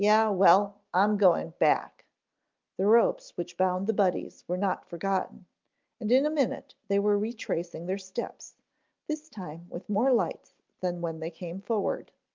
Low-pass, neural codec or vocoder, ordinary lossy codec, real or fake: 7.2 kHz; none; Opus, 32 kbps; real